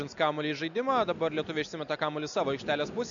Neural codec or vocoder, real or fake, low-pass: none; real; 7.2 kHz